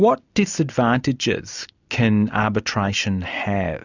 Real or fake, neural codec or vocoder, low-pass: real; none; 7.2 kHz